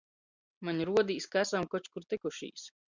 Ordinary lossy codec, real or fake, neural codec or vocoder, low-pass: Opus, 64 kbps; real; none; 7.2 kHz